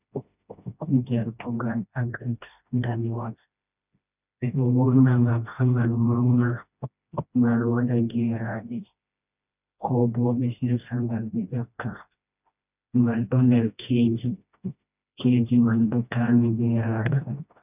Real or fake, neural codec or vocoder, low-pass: fake; codec, 16 kHz, 1 kbps, FreqCodec, smaller model; 3.6 kHz